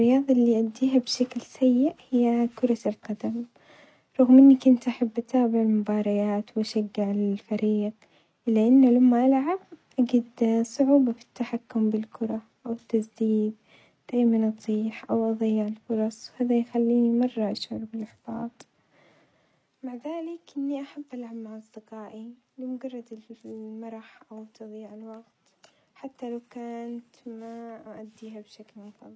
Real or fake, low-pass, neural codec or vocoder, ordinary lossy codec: real; none; none; none